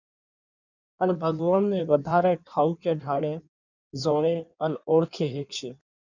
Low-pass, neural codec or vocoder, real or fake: 7.2 kHz; codec, 16 kHz in and 24 kHz out, 1.1 kbps, FireRedTTS-2 codec; fake